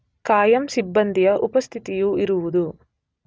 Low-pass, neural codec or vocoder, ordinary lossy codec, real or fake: none; none; none; real